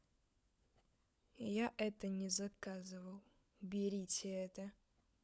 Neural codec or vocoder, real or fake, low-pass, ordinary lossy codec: codec, 16 kHz, 16 kbps, FunCodec, trained on LibriTTS, 50 frames a second; fake; none; none